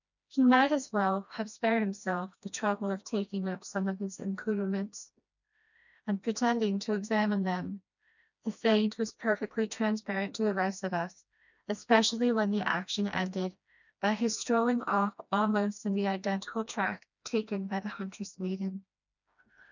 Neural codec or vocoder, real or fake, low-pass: codec, 16 kHz, 1 kbps, FreqCodec, smaller model; fake; 7.2 kHz